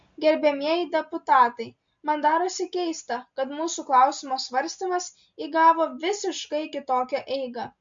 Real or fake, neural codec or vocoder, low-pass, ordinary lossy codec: real; none; 7.2 kHz; MP3, 48 kbps